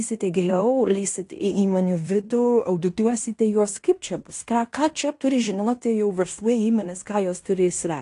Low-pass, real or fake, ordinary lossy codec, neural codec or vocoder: 10.8 kHz; fake; AAC, 48 kbps; codec, 16 kHz in and 24 kHz out, 0.9 kbps, LongCat-Audio-Codec, fine tuned four codebook decoder